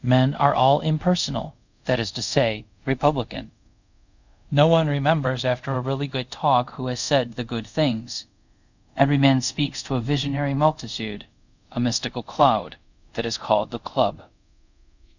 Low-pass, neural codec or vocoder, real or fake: 7.2 kHz; codec, 24 kHz, 0.5 kbps, DualCodec; fake